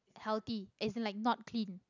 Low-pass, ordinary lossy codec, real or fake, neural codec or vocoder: 7.2 kHz; none; real; none